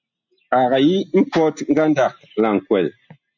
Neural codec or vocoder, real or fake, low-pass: none; real; 7.2 kHz